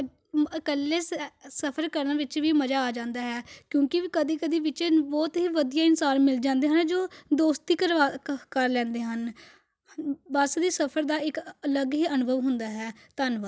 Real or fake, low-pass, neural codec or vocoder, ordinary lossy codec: real; none; none; none